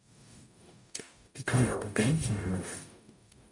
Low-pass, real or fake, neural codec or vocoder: 10.8 kHz; fake; codec, 44.1 kHz, 0.9 kbps, DAC